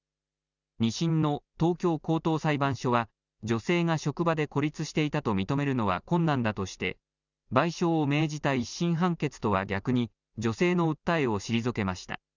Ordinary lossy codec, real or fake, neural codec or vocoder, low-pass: none; real; none; 7.2 kHz